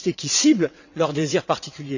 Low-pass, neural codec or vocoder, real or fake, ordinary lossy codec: 7.2 kHz; vocoder, 22.05 kHz, 80 mel bands, WaveNeXt; fake; none